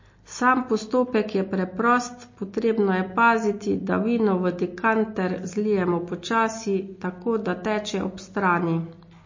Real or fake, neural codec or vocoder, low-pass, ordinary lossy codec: real; none; 7.2 kHz; MP3, 32 kbps